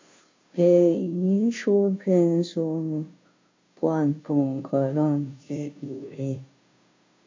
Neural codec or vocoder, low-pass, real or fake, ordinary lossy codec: codec, 16 kHz, 0.5 kbps, FunCodec, trained on Chinese and English, 25 frames a second; 7.2 kHz; fake; MP3, 48 kbps